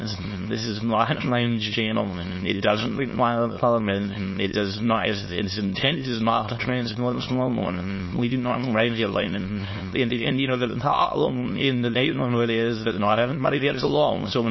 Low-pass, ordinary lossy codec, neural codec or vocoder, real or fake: 7.2 kHz; MP3, 24 kbps; autoencoder, 22.05 kHz, a latent of 192 numbers a frame, VITS, trained on many speakers; fake